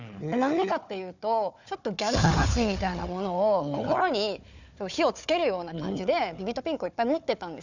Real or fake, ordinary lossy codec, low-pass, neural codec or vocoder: fake; none; 7.2 kHz; codec, 16 kHz, 4 kbps, FunCodec, trained on Chinese and English, 50 frames a second